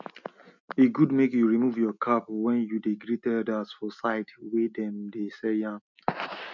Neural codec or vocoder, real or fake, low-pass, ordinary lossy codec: none; real; 7.2 kHz; none